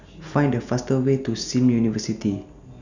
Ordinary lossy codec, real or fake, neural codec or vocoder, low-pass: none; real; none; 7.2 kHz